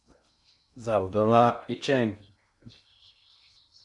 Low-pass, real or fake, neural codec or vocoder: 10.8 kHz; fake; codec, 16 kHz in and 24 kHz out, 0.6 kbps, FocalCodec, streaming, 2048 codes